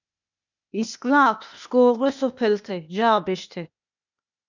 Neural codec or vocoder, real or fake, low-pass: codec, 16 kHz, 0.8 kbps, ZipCodec; fake; 7.2 kHz